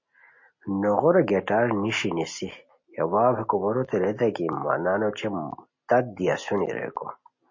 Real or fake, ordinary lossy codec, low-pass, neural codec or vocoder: real; MP3, 32 kbps; 7.2 kHz; none